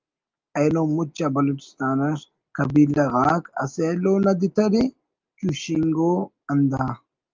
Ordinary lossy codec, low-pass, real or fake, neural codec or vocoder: Opus, 24 kbps; 7.2 kHz; real; none